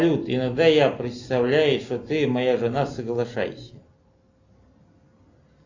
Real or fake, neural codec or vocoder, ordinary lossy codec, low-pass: real; none; AAC, 48 kbps; 7.2 kHz